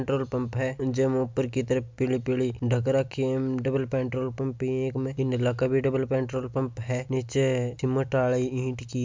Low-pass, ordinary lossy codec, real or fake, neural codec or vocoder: 7.2 kHz; MP3, 64 kbps; real; none